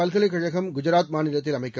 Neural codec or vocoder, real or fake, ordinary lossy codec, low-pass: none; real; none; none